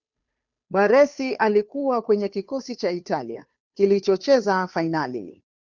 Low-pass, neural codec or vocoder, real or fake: 7.2 kHz; codec, 16 kHz, 2 kbps, FunCodec, trained on Chinese and English, 25 frames a second; fake